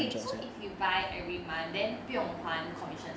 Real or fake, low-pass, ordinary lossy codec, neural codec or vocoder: real; none; none; none